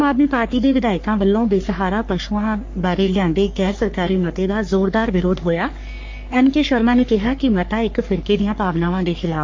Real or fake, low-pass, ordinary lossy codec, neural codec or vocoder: fake; 7.2 kHz; MP3, 48 kbps; codec, 44.1 kHz, 3.4 kbps, Pupu-Codec